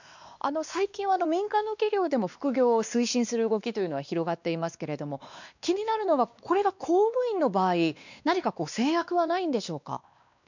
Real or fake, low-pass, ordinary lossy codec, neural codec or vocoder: fake; 7.2 kHz; none; codec, 16 kHz, 2 kbps, X-Codec, WavLM features, trained on Multilingual LibriSpeech